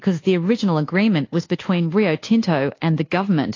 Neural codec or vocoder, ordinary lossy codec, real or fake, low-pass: codec, 24 kHz, 1.2 kbps, DualCodec; AAC, 32 kbps; fake; 7.2 kHz